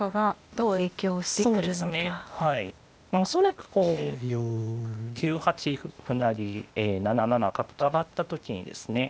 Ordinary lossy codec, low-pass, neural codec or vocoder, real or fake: none; none; codec, 16 kHz, 0.8 kbps, ZipCodec; fake